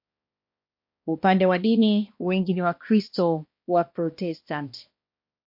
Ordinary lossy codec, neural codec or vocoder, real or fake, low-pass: MP3, 32 kbps; codec, 16 kHz, 1 kbps, X-Codec, HuBERT features, trained on balanced general audio; fake; 5.4 kHz